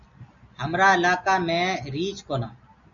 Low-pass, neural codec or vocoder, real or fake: 7.2 kHz; none; real